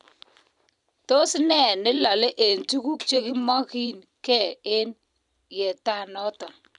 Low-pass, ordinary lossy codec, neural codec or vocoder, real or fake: 10.8 kHz; none; vocoder, 44.1 kHz, 128 mel bands every 512 samples, BigVGAN v2; fake